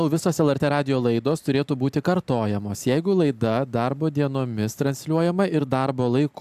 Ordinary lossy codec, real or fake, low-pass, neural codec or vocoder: AAC, 96 kbps; real; 14.4 kHz; none